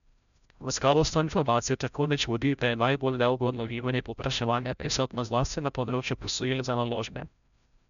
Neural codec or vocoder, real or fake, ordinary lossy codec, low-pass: codec, 16 kHz, 0.5 kbps, FreqCodec, larger model; fake; none; 7.2 kHz